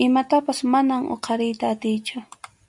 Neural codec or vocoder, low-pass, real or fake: none; 10.8 kHz; real